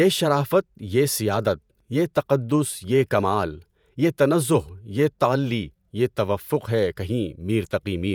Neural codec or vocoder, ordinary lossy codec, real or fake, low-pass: vocoder, 48 kHz, 128 mel bands, Vocos; none; fake; none